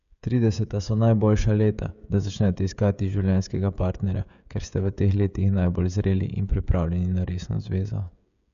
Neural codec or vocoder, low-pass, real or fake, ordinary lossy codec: codec, 16 kHz, 16 kbps, FreqCodec, smaller model; 7.2 kHz; fake; none